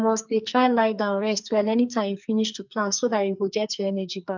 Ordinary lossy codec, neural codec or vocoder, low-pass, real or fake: MP3, 64 kbps; codec, 44.1 kHz, 2.6 kbps, SNAC; 7.2 kHz; fake